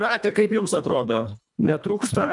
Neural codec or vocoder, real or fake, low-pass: codec, 24 kHz, 1.5 kbps, HILCodec; fake; 10.8 kHz